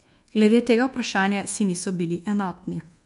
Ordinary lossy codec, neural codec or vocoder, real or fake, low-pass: MP3, 64 kbps; codec, 24 kHz, 1.2 kbps, DualCodec; fake; 10.8 kHz